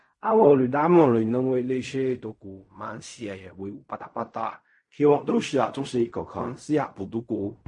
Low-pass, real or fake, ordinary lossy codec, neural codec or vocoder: 10.8 kHz; fake; MP3, 48 kbps; codec, 16 kHz in and 24 kHz out, 0.4 kbps, LongCat-Audio-Codec, fine tuned four codebook decoder